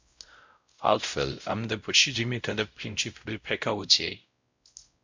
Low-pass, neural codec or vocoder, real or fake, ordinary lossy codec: 7.2 kHz; codec, 16 kHz, 0.5 kbps, X-Codec, WavLM features, trained on Multilingual LibriSpeech; fake; MP3, 64 kbps